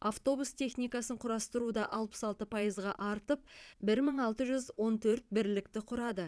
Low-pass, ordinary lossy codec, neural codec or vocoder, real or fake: none; none; vocoder, 22.05 kHz, 80 mel bands, Vocos; fake